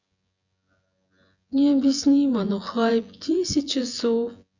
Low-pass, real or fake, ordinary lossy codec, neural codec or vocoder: 7.2 kHz; fake; Opus, 64 kbps; vocoder, 24 kHz, 100 mel bands, Vocos